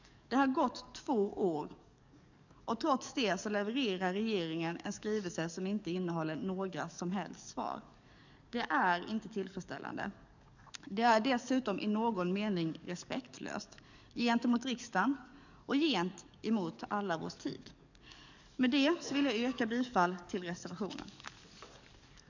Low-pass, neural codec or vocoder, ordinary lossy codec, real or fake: 7.2 kHz; codec, 44.1 kHz, 7.8 kbps, DAC; none; fake